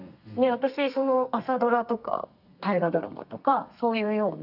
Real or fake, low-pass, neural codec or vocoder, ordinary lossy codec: fake; 5.4 kHz; codec, 32 kHz, 1.9 kbps, SNAC; none